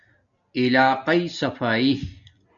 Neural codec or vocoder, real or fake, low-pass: none; real; 7.2 kHz